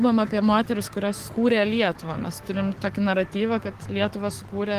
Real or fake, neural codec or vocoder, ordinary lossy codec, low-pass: fake; codec, 44.1 kHz, 7.8 kbps, DAC; Opus, 16 kbps; 14.4 kHz